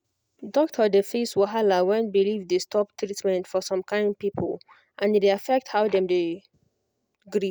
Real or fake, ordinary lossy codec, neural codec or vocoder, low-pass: real; none; none; none